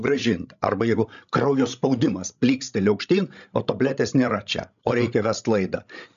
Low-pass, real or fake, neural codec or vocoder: 7.2 kHz; fake; codec, 16 kHz, 16 kbps, FreqCodec, larger model